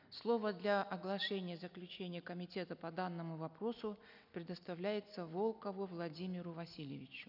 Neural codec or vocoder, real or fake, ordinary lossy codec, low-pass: none; real; none; 5.4 kHz